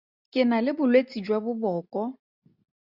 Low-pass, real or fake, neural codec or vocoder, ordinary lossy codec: 5.4 kHz; real; none; Opus, 64 kbps